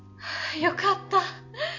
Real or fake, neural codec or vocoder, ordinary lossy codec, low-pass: real; none; none; 7.2 kHz